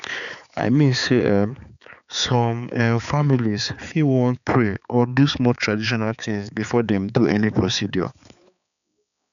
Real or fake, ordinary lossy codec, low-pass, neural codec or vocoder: fake; none; 7.2 kHz; codec, 16 kHz, 4 kbps, X-Codec, HuBERT features, trained on balanced general audio